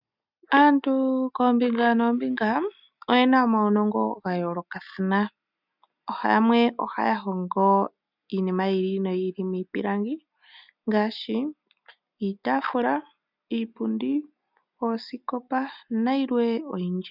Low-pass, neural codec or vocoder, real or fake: 5.4 kHz; none; real